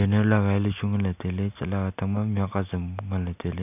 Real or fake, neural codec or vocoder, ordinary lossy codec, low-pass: real; none; none; 3.6 kHz